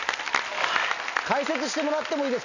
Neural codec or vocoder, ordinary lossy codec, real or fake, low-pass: none; none; real; 7.2 kHz